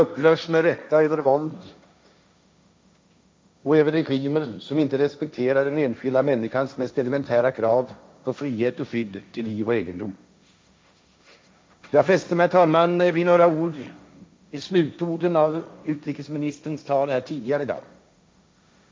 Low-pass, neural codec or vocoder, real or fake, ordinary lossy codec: none; codec, 16 kHz, 1.1 kbps, Voila-Tokenizer; fake; none